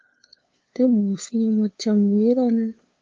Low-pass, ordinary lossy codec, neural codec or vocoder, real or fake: 7.2 kHz; Opus, 32 kbps; codec, 16 kHz, 2 kbps, FunCodec, trained on LibriTTS, 25 frames a second; fake